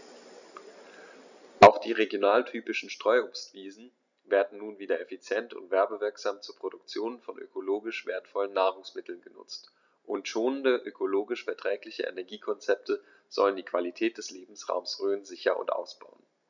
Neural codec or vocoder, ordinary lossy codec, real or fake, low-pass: none; none; real; 7.2 kHz